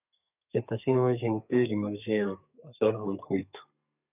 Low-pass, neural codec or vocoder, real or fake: 3.6 kHz; codec, 32 kHz, 1.9 kbps, SNAC; fake